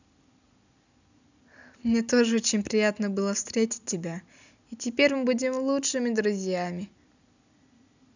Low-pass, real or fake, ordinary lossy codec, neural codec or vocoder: 7.2 kHz; real; none; none